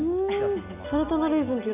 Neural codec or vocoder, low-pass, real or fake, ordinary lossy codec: none; 3.6 kHz; real; none